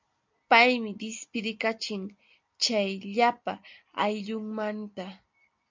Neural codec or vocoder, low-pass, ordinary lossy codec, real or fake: none; 7.2 kHz; MP3, 64 kbps; real